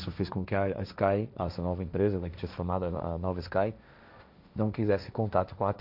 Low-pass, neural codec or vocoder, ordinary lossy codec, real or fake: 5.4 kHz; codec, 16 kHz, 1.1 kbps, Voila-Tokenizer; none; fake